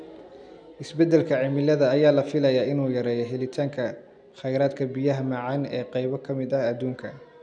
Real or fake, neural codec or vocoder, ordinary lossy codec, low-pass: real; none; none; 9.9 kHz